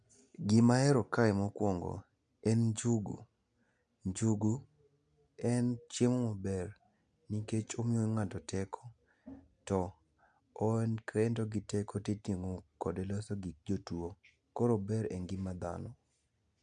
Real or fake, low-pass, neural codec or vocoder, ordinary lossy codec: real; 9.9 kHz; none; none